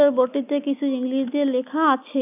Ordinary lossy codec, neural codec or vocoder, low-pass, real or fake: none; none; 3.6 kHz; real